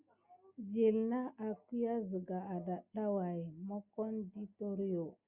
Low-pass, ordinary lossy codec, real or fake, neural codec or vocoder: 3.6 kHz; Opus, 64 kbps; real; none